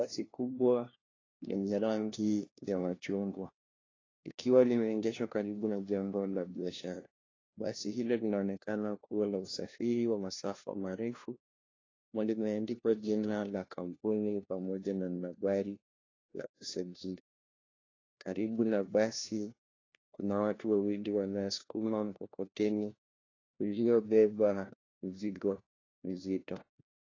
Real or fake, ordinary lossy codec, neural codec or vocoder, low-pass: fake; AAC, 32 kbps; codec, 16 kHz, 1 kbps, FunCodec, trained on LibriTTS, 50 frames a second; 7.2 kHz